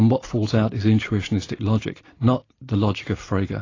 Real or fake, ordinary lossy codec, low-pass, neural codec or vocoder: real; AAC, 32 kbps; 7.2 kHz; none